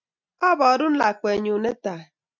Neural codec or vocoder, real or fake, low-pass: none; real; 7.2 kHz